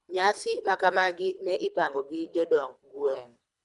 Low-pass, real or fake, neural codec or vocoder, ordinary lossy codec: 10.8 kHz; fake; codec, 24 kHz, 3 kbps, HILCodec; none